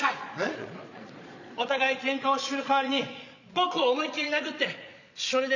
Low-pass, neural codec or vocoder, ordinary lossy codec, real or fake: 7.2 kHz; vocoder, 22.05 kHz, 80 mel bands, Vocos; none; fake